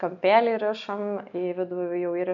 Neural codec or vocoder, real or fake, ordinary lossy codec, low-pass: none; real; MP3, 96 kbps; 7.2 kHz